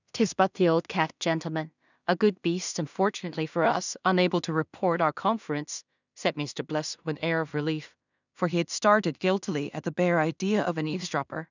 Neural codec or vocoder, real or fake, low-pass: codec, 16 kHz in and 24 kHz out, 0.4 kbps, LongCat-Audio-Codec, two codebook decoder; fake; 7.2 kHz